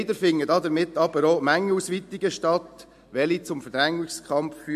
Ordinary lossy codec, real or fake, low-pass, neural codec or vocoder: MP3, 64 kbps; real; 14.4 kHz; none